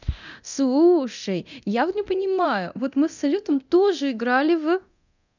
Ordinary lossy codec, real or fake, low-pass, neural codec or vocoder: none; fake; 7.2 kHz; codec, 24 kHz, 0.9 kbps, DualCodec